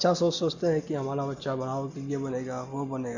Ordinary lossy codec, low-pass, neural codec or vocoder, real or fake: none; 7.2 kHz; none; real